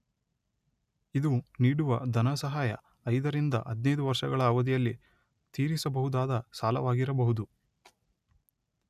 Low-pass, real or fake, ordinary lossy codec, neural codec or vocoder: 14.4 kHz; real; none; none